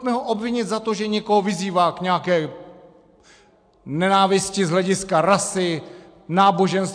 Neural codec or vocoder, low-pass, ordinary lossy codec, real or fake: none; 9.9 kHz; AAC, 64 kbps; real